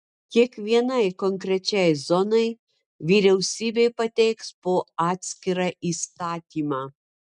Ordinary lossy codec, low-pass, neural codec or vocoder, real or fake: MP3, 96 kbps; 10.8 kHz; none; real